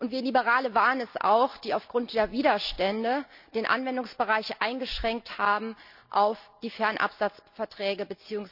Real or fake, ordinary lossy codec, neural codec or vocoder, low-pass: real; none; none; 5.4 kHz